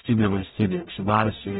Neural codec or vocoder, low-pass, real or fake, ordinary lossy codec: codec, 44.1 kHz, 0.9 kbps, DAC; 19.8 kHz; fake; AAC, 16 kbps